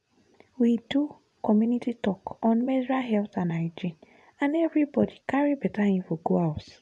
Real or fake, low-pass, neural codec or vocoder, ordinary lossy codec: fake; none; vocoder, 24 kHz, 100 mel bands, Vocos; none